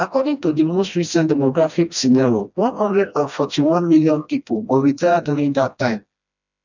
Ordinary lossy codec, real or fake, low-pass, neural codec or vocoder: none; fake; 7.2 kHz; codec, 16 kHz, 1 kbps, FreqCodec, smaller model